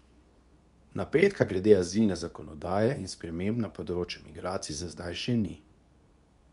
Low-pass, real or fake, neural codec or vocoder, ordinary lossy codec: 10.8 kHz; fake; codec, 24 kHz, 0.9 kbps, WavTokenizer, medium speech release version 2; MP3, 96 kbps